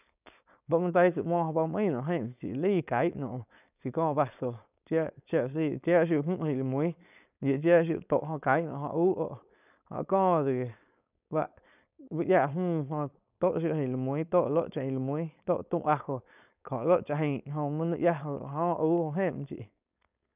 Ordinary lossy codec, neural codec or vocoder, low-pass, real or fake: none; codec, 16 kHz, 4.8 kbps, FACodec; 3.6 kHz; fake